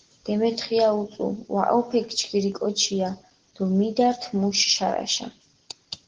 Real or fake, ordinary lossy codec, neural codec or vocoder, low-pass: real; Opus, 16 kbps; none; 7.2 kHz